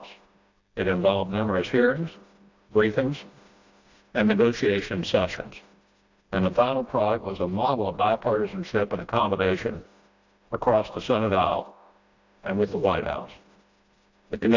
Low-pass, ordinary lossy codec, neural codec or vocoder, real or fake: 7.2 kHz; AAC, 48 kbps; codec, 16 kHz, 1 kbps, FreqCodec, smaller model; fake